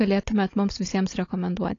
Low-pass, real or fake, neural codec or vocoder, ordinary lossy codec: 7.2 kHz; fake; codec, 16 kHz, 16 kbps, FunCodec, trained on Chinese and English, 50 frames a second; AAC, 32 kbps